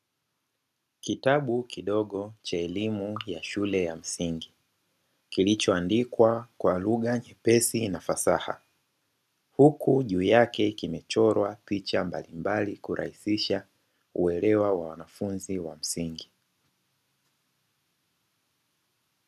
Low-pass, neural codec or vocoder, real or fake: 14.4 kHz; none; real